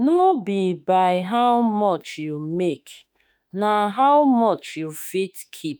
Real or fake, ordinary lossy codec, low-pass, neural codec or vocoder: fake; none; none; autoencoder, 48 kHz, 32 numbers a frame, DAC-VAE, trained on Japanese speech